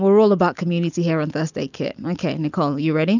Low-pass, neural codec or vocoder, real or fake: 7.2 kHz; none; real